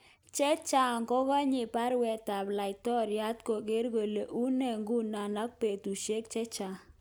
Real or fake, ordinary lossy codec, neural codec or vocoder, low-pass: real; none; none; none